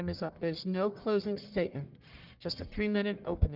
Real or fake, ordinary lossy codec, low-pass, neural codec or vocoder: fake; Opus, 32 kbps; 5.4 kHz; codec, 44.1 kHz, 1.7 kbps, Pupu-Codec